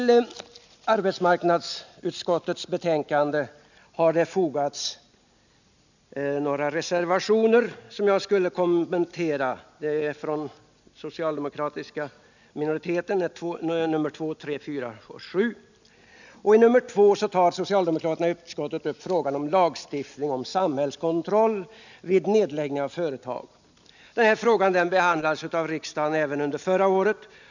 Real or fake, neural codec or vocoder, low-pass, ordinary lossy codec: real; none; 7.2 kHz; none